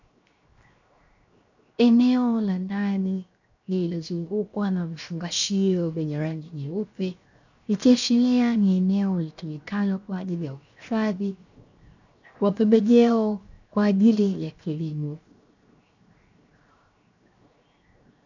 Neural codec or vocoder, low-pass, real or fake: codec, 16 kHz, 0.7 kbps, FocalCodec; 7.2 kHz; fake